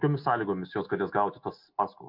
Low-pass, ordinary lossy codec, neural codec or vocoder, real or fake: 5.4 kHz; MP3, 48 kbps; none; real